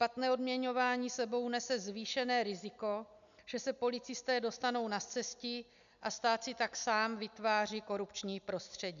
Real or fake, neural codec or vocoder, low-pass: real; none; 7.2 kHz